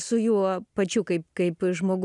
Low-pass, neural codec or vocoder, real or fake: 10.8 kHz; none; real